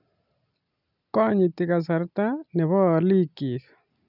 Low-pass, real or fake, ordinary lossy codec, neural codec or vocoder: 5.4 kHz; real; none; none